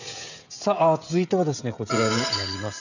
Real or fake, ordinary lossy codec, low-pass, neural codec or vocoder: fake; none; 7.2 kHz; codec, 16 kHz, 16 kbps, FreqCodec, smaller model